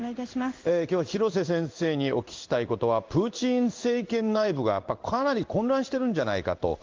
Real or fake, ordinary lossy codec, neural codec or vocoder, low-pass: fake; Opus, 24 kbps; codec, 16 kHz in and 24 kHz out, 1 kbps, XY-Tokenizer; 7.2 kHz